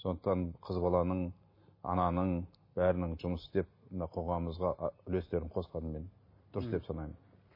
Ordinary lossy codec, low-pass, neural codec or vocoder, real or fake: MP3, 24 kbps; 5.4 kHz; none; real